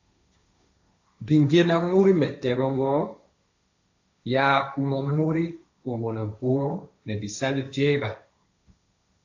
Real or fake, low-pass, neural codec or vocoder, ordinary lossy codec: fake; 7.2 kHz; codec, 16 kHz, 1.1 kbps, Voila-Tokenizer; AAC, 48 kbps